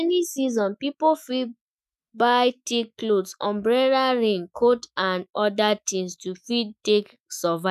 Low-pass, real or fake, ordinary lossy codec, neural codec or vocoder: 14.4 kHz; fake; none; autoencoder, 48 kHz, 128 numbers a frame, DAC-VAE, trained on Japanese speech